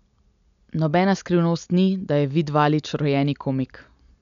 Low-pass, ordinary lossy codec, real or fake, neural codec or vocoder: 7.2 kHz; none; real; none